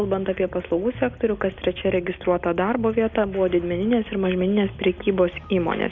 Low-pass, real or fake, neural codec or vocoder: 7.2 kHz; real; none